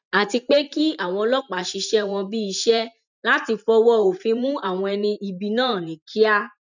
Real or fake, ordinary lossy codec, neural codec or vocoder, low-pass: fake; none; vocoder, 44.1 kHz, 128 mel bands every 512 samples, BigVGAN v2; 7.2 kHz